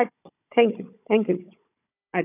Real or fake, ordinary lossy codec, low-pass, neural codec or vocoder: fake; none; 3.6 kHz; codec, 16 kHz, 16 kbps, FunCodec, trained on Chinese and English, 50 frames a second